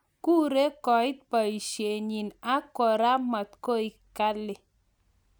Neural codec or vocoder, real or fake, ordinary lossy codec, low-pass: none; real; none; none